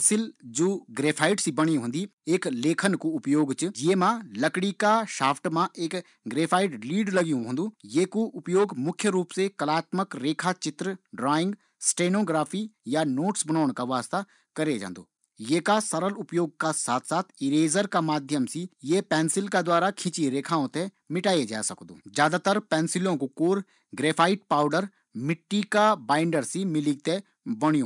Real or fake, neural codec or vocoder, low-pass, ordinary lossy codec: real; none; 10.8 kHz; none